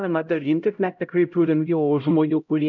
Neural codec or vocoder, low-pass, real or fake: codec, 16 kHz, 0.5 kbps, X-Codec, HuBERT features, trained on LibriSpeech; 7.2 kHz; fake